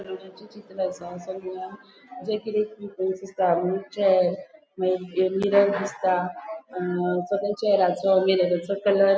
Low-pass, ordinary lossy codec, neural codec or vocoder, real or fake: none; none; none; real